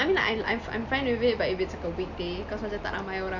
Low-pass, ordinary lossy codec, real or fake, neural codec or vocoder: 7.2 kHz; none; real; none